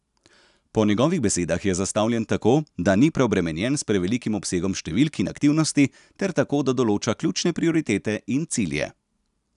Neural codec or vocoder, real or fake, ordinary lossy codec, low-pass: none; real; none; 10.8 kHz